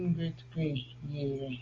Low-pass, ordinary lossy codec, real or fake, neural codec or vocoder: 7.2 kHz; Opus, 32 kbps; real; none